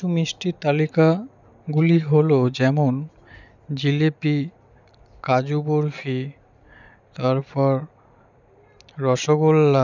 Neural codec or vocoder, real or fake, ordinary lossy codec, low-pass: none; real; none; 7.2 kHz